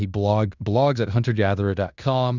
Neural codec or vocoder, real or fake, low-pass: codec, 16 kHz in and 24 kHz out, 0.9 kbps, LongCat-Audio-Codec, fine tuned four codebook decoder; fake; 7.2 kHz